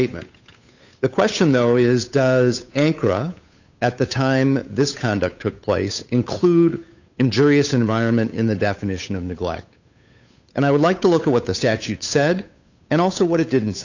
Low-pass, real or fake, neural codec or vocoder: 7.2 kHz; fake; codec, 16 kHz, 8 kbps, FunCodec, trained on Chinese and English, 25 frames a second